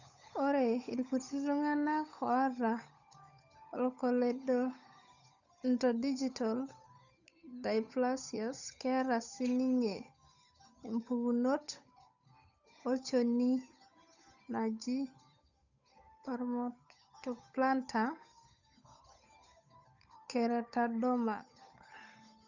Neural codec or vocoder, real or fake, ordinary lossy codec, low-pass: codec, 16 kHz, 8 kbps, FunCodec, trained on Chinese and English, 25 frames a second; fake; none; 7.2 kHz